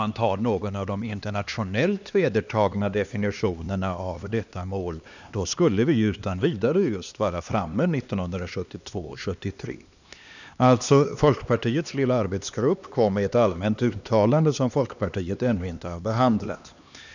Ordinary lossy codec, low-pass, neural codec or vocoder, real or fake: none; 7.2 kHz; codec, 16 kHz, 2 kbps, X-Codec, HuBERT features, trained on LibriSpeech; fake